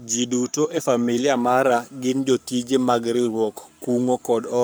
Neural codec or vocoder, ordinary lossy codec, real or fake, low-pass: codec, 44.1 kHz, 7.8 kbps, Pupu-Codec; none; fake; none